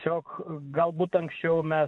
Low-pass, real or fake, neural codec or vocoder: 10.8 kHz; fake; vocoder, 24 kHz, 100 mel bands, Vocos